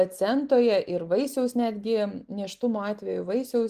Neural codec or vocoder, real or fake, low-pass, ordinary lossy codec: none; real; 14.4 kHz; Opus, 24 kbps